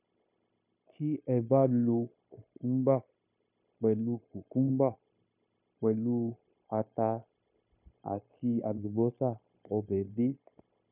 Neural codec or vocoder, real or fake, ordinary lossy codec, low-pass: codec, 16 kHz, 0.9 kbps, LongCat-Audio-Codec; fake; none; 3.6 kHz